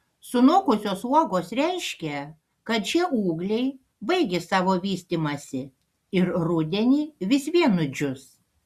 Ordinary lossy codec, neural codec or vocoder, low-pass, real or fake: Opus, 64 kbps; none; 14.4 kHz; real